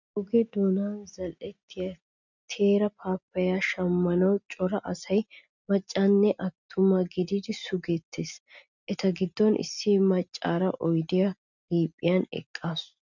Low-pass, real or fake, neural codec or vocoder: 7.2 kHz; real; none